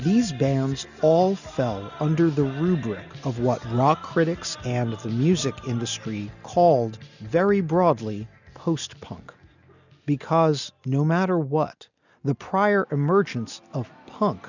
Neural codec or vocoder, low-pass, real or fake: none; 7.2 kHz; real